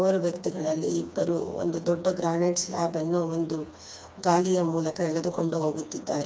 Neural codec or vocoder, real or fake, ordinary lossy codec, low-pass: codec, 16 kHz, 2 kbps, FreqCodec, smaller model; fake; none; none